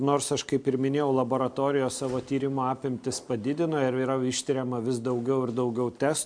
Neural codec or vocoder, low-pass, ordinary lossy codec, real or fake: none; 9.9 kHz; MP3, 64 kbps; real